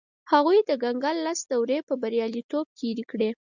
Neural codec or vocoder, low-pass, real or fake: none; 7.2 kHz; real